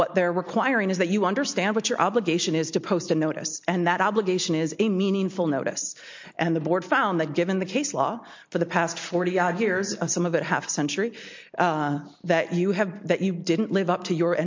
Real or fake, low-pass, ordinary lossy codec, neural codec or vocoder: real; 7.2 kHz; MP3, 48 kbps; none